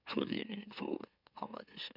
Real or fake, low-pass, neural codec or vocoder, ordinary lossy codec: fake; 5.4 kHz; autoencoder, 44.1 kHz, a latent of 192 numbers a frame, MeloTTS; none